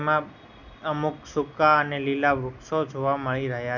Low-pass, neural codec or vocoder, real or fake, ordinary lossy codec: 7.2 kHz; none; real; none